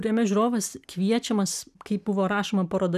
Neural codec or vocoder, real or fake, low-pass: none; real; 14.4 kHz